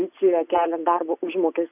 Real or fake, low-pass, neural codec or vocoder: real; 3.6 kHz; none